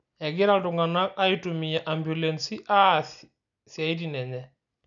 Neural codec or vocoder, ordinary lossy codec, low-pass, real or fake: none; none; 7.2 kHz; real